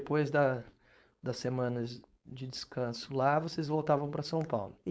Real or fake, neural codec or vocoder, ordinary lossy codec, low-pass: fake; codec, 16 kHz, 4.8 kbps, FACodec; none; none